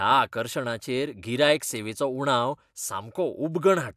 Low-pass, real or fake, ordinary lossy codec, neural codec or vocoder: 14.4 kHz; real; AAC, 96 kbps; none